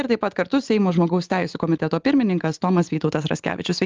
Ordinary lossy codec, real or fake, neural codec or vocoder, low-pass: Opus, 32 kbps; real; none; 7.2 kHz